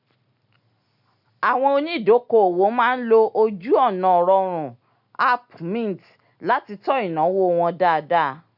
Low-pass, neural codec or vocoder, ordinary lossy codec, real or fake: 5.4 kHz; none; none; real